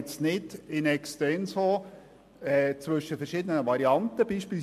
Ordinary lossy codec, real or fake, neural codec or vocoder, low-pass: MP3, 64 kbps; real; none; 14.4 kHz